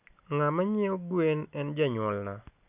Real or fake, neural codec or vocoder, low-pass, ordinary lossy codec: real; none; 3.6 kHz; none